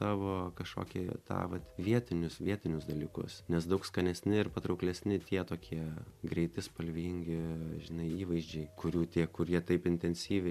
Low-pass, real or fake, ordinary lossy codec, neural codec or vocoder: 14.4 kHz; real; MP3, 96 kbps; none